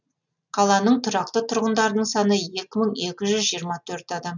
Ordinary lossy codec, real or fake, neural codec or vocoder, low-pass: none; real; none; 7.2 kHz